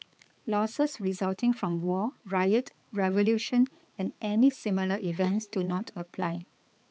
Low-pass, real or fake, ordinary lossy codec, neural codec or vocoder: none; fake; none; codec, 16 kHz, 4 kbps, X-Codec, HuBERT features, trained on balanced general audio